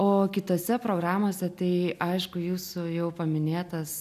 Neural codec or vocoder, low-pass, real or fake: none; 14.4 kHz; real